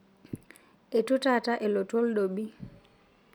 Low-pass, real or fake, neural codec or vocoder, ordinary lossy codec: none; real; none; none